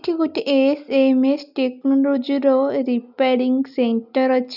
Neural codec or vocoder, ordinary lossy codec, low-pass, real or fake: none; none; 5.4 kHz; real